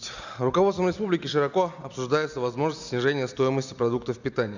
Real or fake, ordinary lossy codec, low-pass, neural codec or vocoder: real; none; 7.2 kHz; none